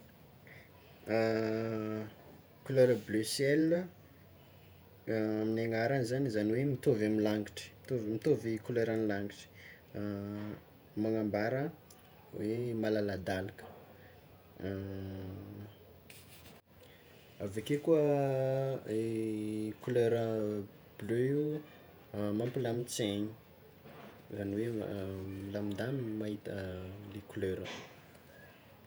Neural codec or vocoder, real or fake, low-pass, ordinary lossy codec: vocoder, 48 kHz, 128 mel bands, Vocos; fake; none; none